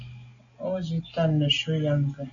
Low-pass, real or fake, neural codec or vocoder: 7.2 kHz; real; none